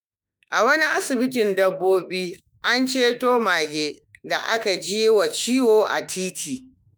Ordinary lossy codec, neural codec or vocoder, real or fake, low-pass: none; autoencoder, 48 kHz, 32 numbers a frame, DAC-VAE, trained on Japanese speech; fake; none